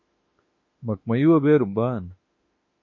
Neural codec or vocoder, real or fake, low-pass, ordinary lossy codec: autoencoder, 48 kHz, 32 numbers a frame, DAC-VAE, trained on Japanese speech; fake; 7.2 kHz; MP3, 32 kbps